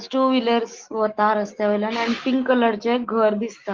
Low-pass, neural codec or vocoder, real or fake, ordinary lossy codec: 7.2 kHz; autoencoder, 48 kHz, 128 numbers a frame, DAC-VAE, trained on Japanese speech; fake; Opus, 16 kbps